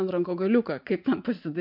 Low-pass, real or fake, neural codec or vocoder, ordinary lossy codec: 5.4 kHz; fake; vocoder, 44.1 kHz, 80 mel bands, Vocos; MP3, 48 kbps